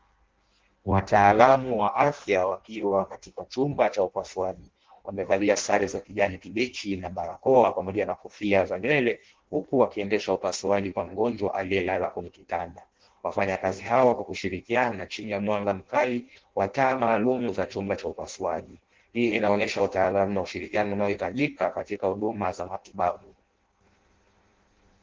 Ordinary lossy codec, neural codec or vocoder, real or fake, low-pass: Opus, 16 kbps; codec, 16 kHz in and 24 kHz out, 0.6 kbps, FireRedTTS-2 codec; fake; 7.2 kHz